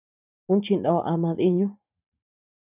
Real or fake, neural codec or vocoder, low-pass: fake; vocoder, 44.1 kHz, 128 mel bands every 512 samples, BigVGAN v2; 3.6 kHz